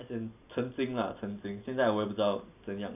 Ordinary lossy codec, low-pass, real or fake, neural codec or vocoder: none; 3.6 kHz; real; none